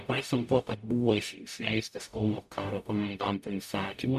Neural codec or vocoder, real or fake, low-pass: codec, 44.1 kHz, 0.9 kbps, DAC; fake; 14.4 kHz